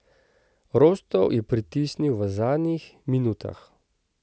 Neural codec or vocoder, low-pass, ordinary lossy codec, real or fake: none; none; none; real